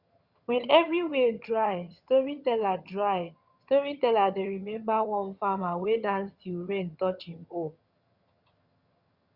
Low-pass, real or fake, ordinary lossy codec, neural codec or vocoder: 5.4 kHz; fake; Opus, 64 kbps; vocoder, 22.05 kHz, 80 mel bands, HiFi-GAN